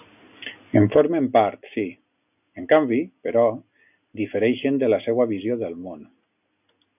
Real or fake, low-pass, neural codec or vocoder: real; 3.6 kHz; none